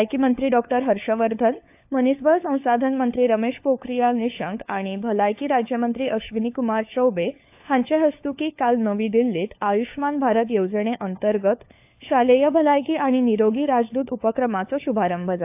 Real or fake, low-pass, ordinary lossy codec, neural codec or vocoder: fake; 3.6 kHz; AAC, 32 kbps; codec, 16 kHz, 4 kbps, FunCodec, trained on LibriTTS, 50 frames a second